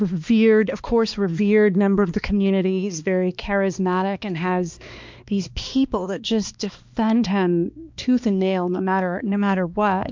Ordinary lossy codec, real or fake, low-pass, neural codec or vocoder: MP3, 64 kbps; fake; 7.2 kHz; codec, 16 kHz, 2 kbps, X-Codec, HuBERT features, trained on balanced general audio